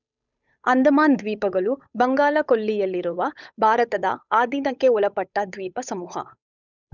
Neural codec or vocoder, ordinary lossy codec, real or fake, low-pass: codec, 16 kHz, 8 kbps, FunCodec, trained on Chinese and English, 25 frames a second; none; fake; 7.2 kHz